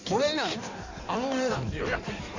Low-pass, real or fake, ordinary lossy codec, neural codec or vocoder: 7.2 kHz; fake; AAC, 48 kbps; codec, 16 kHz in and 24 kHz out, 1.1 kbps, FireRedTTS-2 codec